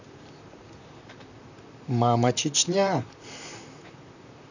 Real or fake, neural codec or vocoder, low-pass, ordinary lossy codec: fake; vocoder, 44.1 kHz, 128 mel bands, Pupu-Vocoder; 7.2 kHz; none